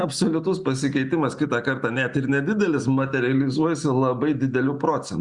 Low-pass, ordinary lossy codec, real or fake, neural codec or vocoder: 10.8 kHz; Opus, 64 kbps; real; none